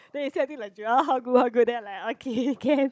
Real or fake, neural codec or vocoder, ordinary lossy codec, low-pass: fake; codec, 16 kHz, 16 kbps, FunCodec, trained on Chinese and English, 50 frames a second; none; none